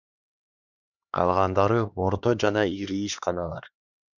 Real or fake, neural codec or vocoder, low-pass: fake; codec, 16 kHz, 2 kbps, X-Codec, HuBERT features, trained on LibriSpeech; 7.2 kHz